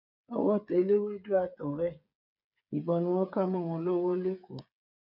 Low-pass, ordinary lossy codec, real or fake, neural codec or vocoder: 5.4 kHz; none; fake; codec, 16 kHz, 8 kbps, FreqCodec, smaller model